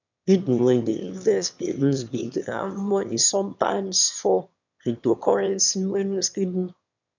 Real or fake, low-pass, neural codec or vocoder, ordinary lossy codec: fake; 7.2 kHz; autoencoder, 22.05 kHz, a latent of 192 numbers a frame, VITS, trained on one speaker; none